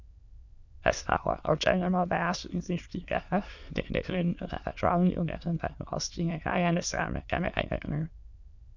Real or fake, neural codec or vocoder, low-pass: fake; autoencoder, 22.05 kHz, a latent of 192 numbers a frame, VITS, trained on many speakers; 7.2 kHz